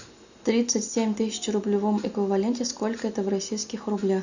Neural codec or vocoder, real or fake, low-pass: none; real; 7.2 kHz